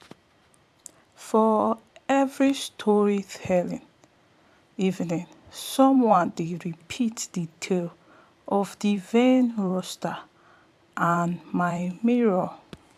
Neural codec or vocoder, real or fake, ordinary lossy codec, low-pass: vocoder, 44.1 kHz, 128 mel bands every 512 samples, BigVGAN v2; fake; AAC, 96 kbps; 14.4 kHz